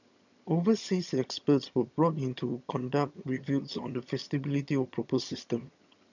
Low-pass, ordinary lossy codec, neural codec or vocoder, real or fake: 7.2 kHz; none; vocoder, 22.05 kHz, 80 mel bands, HiFi-GAN; fake